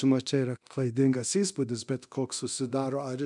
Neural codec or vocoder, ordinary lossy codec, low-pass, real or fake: codec, 24 kHz, 0.9 kbps, DualCodec; MP3, 96 kbps; 10.8 kHz; fake